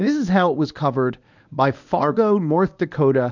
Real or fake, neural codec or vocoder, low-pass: fake; codec, 24 kHz, 0.9 kbps, WavTokenizer, medium speech release version 1; 7.2 kHz